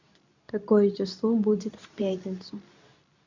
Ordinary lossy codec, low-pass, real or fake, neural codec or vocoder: none; 7.2 kHz; fake; codec, 24 kHz, 0.9 kbps, WavTokenizer, medium speech release version 2